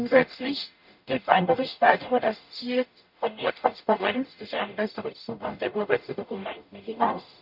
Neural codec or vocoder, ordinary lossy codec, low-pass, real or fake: codec, 44.1 kHz, 0.9 kbps, DAC; AAC, 48 kbps; 5.4 kHz; fake